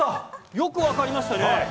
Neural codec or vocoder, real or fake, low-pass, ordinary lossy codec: none; real; none; none